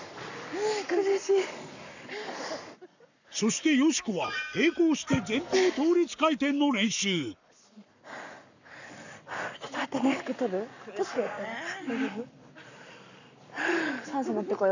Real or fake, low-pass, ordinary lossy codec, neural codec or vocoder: fake; 7.2 kHz; none; codec, 44.1 kHz, 7.8 kbps, Pupu-Codec